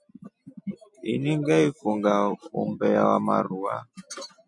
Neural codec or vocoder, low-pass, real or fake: none; 10.8 kHz; real